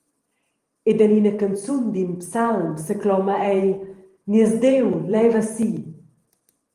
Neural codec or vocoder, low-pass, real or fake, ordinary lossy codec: vocoder, 44.1 kHz, 128 mel bands every 512 samples, BigVGAN v2; 14.4 kHz; fake; Opus, 24 kbps